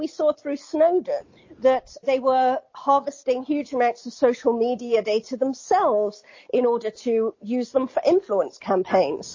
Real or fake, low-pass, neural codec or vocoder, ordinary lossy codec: real; 7.2 kHz; none; MP3, 32 kbps